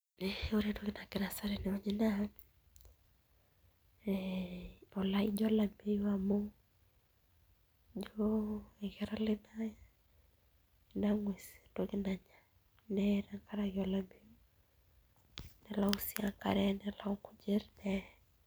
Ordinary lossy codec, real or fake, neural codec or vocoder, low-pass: none; real; none; none